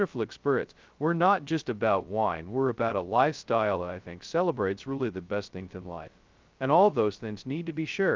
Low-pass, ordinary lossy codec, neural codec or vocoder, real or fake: 7.2 kHz; Opus, 32 kbps; codec, 16 kHz, 0.2 kbps, FocalCodec; fake